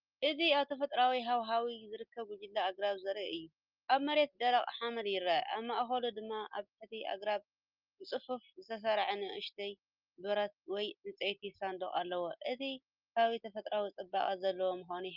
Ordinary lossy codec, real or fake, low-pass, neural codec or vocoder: Opus, 24 kbps; real; 5.4 kHz; none